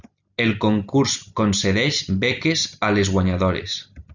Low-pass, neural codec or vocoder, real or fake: 7.2 kHz; none; real